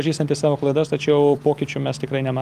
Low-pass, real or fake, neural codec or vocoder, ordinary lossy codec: 14.4 kHz; real; none; Opus, 32 kbps